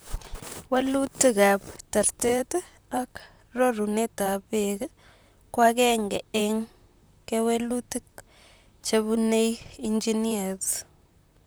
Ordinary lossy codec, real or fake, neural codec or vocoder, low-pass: none; fake; vocoder, 44.1 kHz, 128 mel bands, Pupu-Vocoder; none